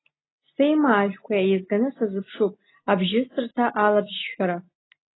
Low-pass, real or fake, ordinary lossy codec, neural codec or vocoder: 7.2 kHz; real; AAC, 16 kbps; none